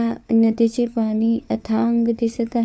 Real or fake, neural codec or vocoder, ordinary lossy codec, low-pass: fake; codec, 16 kHz, 4 kbps, FunCodec, trained on LibriTTS, 50 frames a second; none; none